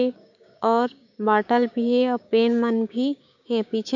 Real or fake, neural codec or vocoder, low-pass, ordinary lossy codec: fake; codec, 16 kHz, 6 kbps, DAC; 7.2 kHz; none